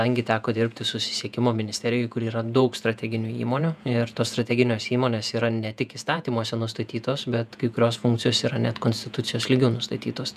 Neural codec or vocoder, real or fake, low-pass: vocoder, 48 kHz, 128 mel bands, Vocos; fake; 14.4 kHz